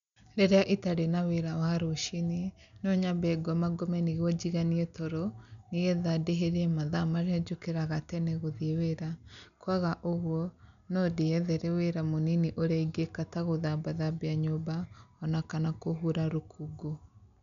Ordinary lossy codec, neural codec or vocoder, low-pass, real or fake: none; none; 7.2 kHz; real